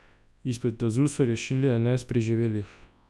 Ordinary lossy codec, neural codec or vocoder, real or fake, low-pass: none; codec, 24 kHz, 0.9 kbps, WavTokenizer, large speech release; fake; none